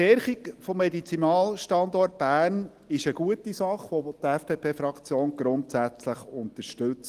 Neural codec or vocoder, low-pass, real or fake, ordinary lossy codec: none; 14.4 kHz; real; Opus, 24 kbps